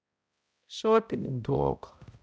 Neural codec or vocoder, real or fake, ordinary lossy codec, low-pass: codec, 16 kHz, 0.5 kbps, X-Codec, HuBERT features, trained on balanced general audio; fake; none; none